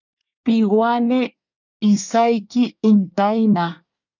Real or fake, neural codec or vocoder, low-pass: fake; codec, 24 kHz, 1 kbps, SNAC; 7.2 kHz